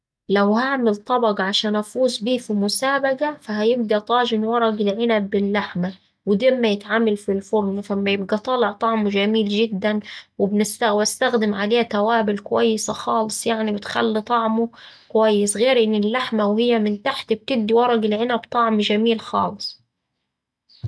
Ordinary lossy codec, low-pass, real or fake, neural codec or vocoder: none; none; real; none